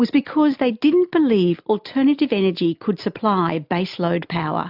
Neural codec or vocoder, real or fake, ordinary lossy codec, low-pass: none; real; AAC, 48 kbps; 5.4 kHz